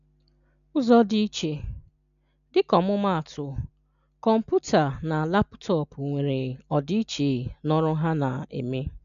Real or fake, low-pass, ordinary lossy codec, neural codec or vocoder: real; 7.2 kHz; none; none